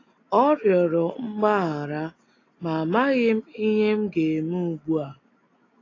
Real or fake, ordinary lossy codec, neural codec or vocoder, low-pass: real; AAC, 32 kbps; none; 7.2 kHz